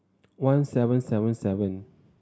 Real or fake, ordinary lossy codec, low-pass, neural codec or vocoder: real; none; none; none